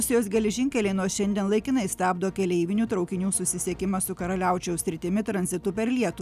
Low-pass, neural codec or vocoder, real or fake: 14.4 kHz; none; real